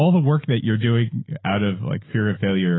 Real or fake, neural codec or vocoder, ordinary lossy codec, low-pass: fake; autoencoder, 48 kHz, 128 numbers a frame, DAC-VAE, trained on Japanese speech; AAC, 16 kbps; 7.2 kHz